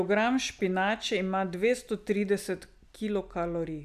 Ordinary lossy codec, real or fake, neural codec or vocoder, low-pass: none; real; none; 14.4 kHz